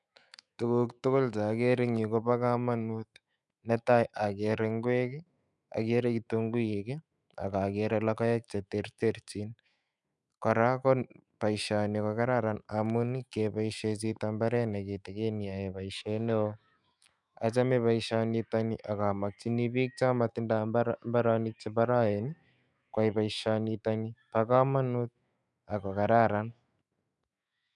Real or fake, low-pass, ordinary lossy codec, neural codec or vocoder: fake; 10.8 kHz; none; autoencoder, 48 kHz, 128 numbers a frame, DAC-VAE, trained on Japanese speech